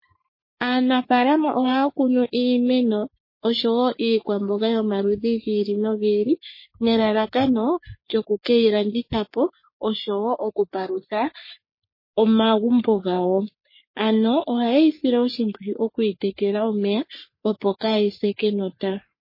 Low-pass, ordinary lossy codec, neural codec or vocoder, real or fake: 5.4 kHz; MP3, 24 kbps; codec, 44.1 kHz, 3.4 kbps, Pupu-Codec; fake